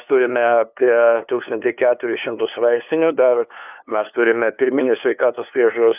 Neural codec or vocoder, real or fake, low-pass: codec, 16 kHz, 2 kbps, FunCodec, trained on LibriTTS, 25 frames a second; fake; 3.6 kHz